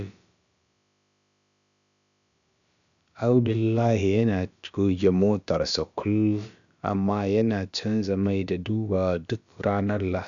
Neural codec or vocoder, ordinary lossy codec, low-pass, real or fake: codec, 16 kHz, about 1 kbps, DyCAST, with the encoder's durations; none; 7.2 kHz; fake